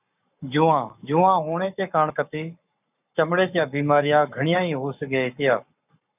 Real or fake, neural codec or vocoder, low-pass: fake; codec, 44.1 kHz, 7.8 kbps, Pupu-Codec; 3.6 kHz